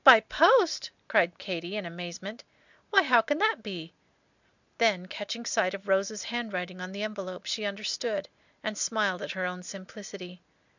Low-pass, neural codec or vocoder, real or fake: 7.2 kHz; none; real